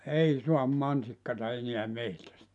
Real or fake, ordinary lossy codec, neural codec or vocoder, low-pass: real; none; none; 10.8 kHz